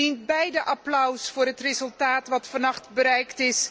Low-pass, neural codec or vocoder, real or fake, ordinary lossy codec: none; none; real; none